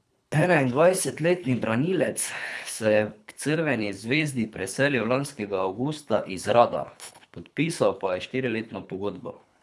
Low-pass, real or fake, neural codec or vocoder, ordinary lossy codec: none; fake; codec, 24 kHz, 3 kbps, HILCodec; none